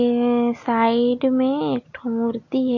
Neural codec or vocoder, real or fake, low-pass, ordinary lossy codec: none; real; 7.2 kHz; MP3, 32 kbps